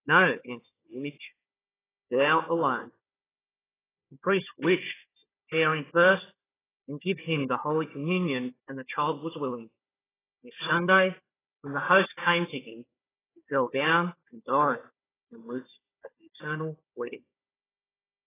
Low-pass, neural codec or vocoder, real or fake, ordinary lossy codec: 3.6 kHz; codec, 16 kHz, 4 kbps, FunCodec, trained on Chinese and English, 50 frames a second; fake; AAC, 16 kbps